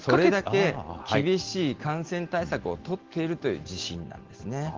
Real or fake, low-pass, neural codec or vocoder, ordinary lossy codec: real; 7.2 kHz; none; Opus, 16 kbps